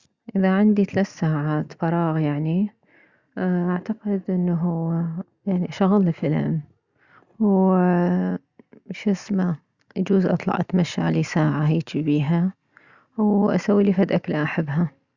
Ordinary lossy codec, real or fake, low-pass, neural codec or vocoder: Opus, 64 kbps; real; 7.2 kHz; none